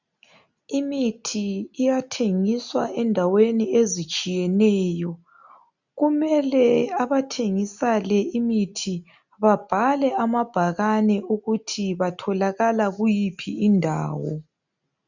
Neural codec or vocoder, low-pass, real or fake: none; 7.2 kHz; real